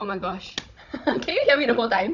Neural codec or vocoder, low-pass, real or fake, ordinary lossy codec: codec, 16 kHz, 16 kbps, FunCodec, trained on Chinese and English, 50 frames a second; 7.2 kHz; fake; none